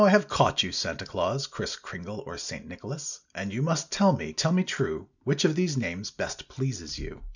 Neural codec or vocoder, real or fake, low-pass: none; real; 7.2 kHz